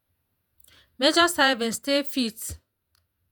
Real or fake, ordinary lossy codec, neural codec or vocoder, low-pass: fake; none; vocoder, 48 kHz, 128 mel bands, Vocos; none